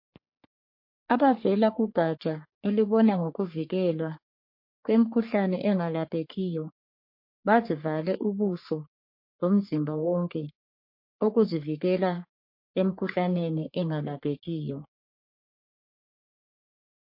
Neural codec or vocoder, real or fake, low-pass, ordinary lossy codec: codec, 44.1 kHz, 3.4 kbps, Pupu-Codec; fake; 5.4 kHz; MP3, 32 kbps